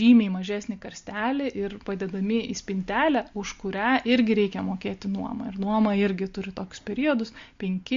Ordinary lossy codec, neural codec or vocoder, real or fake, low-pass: MP3, 48 kbps; none; real; 7.2 kHz